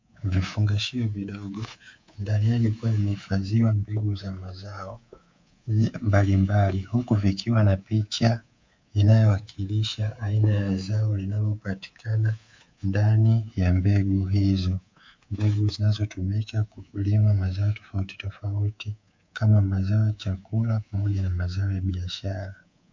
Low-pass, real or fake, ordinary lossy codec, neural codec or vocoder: 7.2 kHz; fake; MP3, 64 kbps; codec, 24 kHz, 3.1 kbps, DualCodec